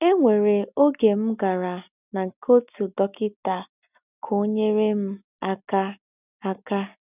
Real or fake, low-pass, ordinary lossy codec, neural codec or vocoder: real; 3.6 kHz; none; none